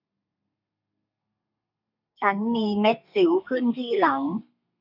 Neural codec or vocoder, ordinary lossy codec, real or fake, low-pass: codec, 32 kHz, 1.9 kbps, SNAC; none; fake; 5.4 kHz